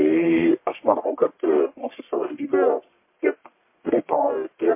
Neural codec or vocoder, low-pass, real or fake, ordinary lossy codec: codec, 44.1 kHz, 1.7 kbps, Pupu-Codec; 3.6 kHz; fake; MP3, 24 kbps